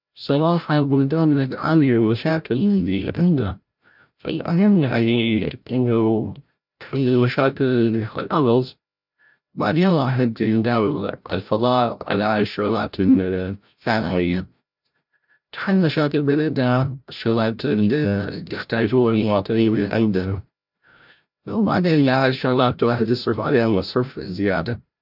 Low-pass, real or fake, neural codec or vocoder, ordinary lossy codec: 5.4 kHz; fake; codec, 16 kHz, 0.5 kbps, FreqCodec, larger model; none